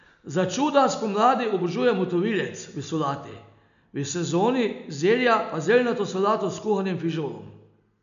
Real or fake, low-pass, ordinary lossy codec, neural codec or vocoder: real; 7.2 kHz; none; none